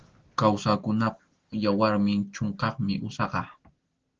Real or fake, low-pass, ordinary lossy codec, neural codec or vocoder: real; 7.2 kHz; Opus, 16 kbps; none